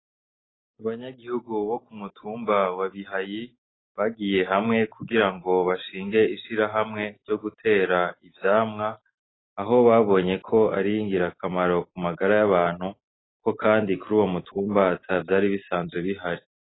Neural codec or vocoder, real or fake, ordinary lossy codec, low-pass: none; real; AAC, 16 kbps; 7.2 kHz